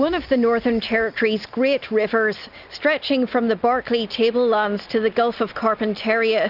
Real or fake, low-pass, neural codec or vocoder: real; 5.4 kHz; none